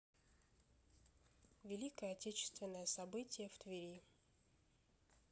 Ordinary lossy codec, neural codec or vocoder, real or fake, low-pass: none; none; real; none